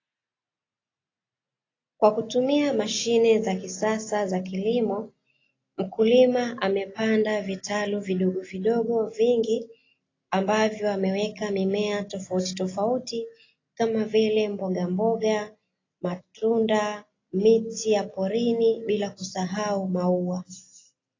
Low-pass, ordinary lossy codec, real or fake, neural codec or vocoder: 7.2 kHz; AAC, 32 kbps; real; none